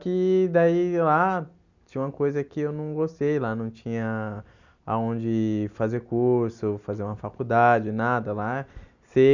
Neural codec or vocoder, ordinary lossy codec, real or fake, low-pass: none; none; real; 7.2 kHz